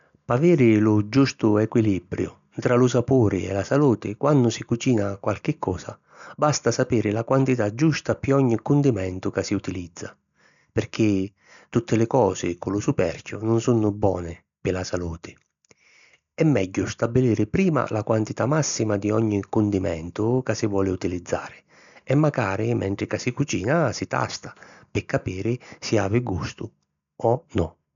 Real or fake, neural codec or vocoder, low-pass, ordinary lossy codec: real; none; 7.2 kHz; none